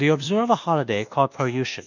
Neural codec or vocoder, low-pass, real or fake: codec, 24 kHz, 1.2 kbps, DualCodec; 7.2 kHz; fake